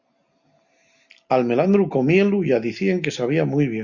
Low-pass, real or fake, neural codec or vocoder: 7.2 kHz; real; none